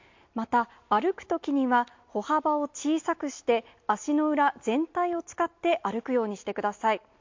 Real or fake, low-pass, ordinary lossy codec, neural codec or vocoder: real; 7.2 kHz; MP3, 48 kbps; none